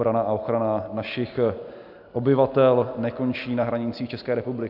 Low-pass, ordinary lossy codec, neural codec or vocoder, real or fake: 5.4 kHz; AAC, 48 kbps; none; real